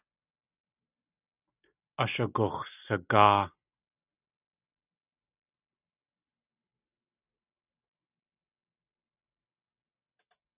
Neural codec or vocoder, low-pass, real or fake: none; 3.6 kHz; real